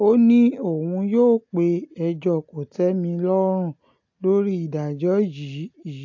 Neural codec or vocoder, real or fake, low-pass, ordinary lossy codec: none; real; 7.2 kHz; AAC, 48 kbps